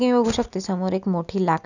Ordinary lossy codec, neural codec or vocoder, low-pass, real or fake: none; none; 7.2 kHz; real